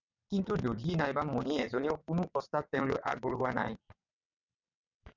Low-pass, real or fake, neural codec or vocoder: 7.2 kHz; fake; vocoder, 22.05 kHz, 80 mel bands, WaveNeXt